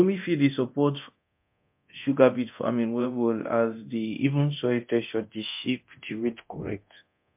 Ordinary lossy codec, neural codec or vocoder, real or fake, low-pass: MP3, 32 kbps; codec, 24 kHz, 0.9 kbps, DualCodec; fake; 3.6 kHz